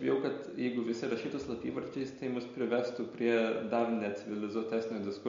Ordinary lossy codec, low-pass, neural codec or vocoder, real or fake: MP3, 48 kbps; 7.2 kHz; none; real